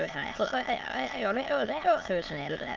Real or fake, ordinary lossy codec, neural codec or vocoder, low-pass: fake; Opus, 32 kbps; autoencoder, 22.05 kHz, a latent of 192 numbers a frame, VITS, trained on many speakers; 7.2 kHz